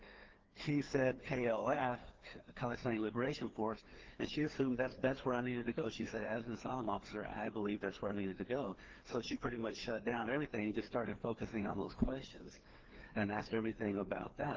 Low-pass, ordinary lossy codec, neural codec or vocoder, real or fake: 7.2 kHz; Opus, 16 kbps; codec, 16 kHz, 2 kbps, FreqCodec, larger model; fake